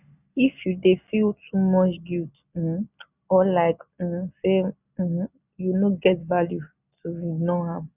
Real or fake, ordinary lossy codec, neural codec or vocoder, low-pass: real; none; none; 3.6 kHz